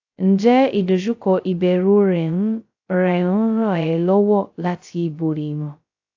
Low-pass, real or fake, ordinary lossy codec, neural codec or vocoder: 7.2 kHz; fake; AAC, 48 kbps; codec, 16 kHz, 0.2 kbps, FocalCodec